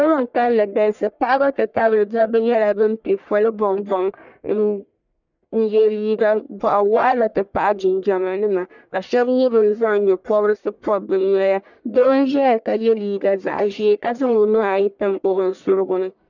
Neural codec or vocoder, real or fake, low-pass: codec, 44.1 kHz, 1.7 kbps, Pupu-Codec; fake; 7.2 kHz